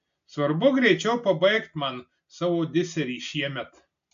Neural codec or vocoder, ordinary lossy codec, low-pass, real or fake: none; AAC, 64 kbps; 7.2 kHz; real